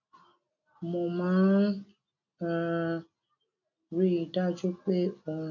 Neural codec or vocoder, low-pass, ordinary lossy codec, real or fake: none; 7.2 kHz; none; real